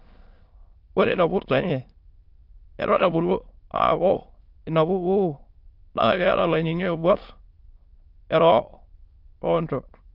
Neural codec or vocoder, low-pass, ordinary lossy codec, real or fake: autoencoder, 22.05 kHz, a latent of 192 numbers a frame, VITS, trained on many speakers; 5.4 kHz; Opus, 32 kbps; fake